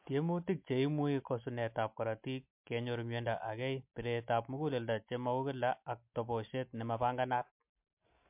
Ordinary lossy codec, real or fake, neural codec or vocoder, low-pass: MP3, 32 kbps; real; none; 3.6 kHz